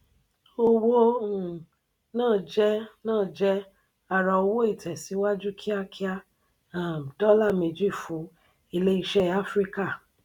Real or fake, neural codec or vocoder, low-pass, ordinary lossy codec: real; none; 19.8 kHz; none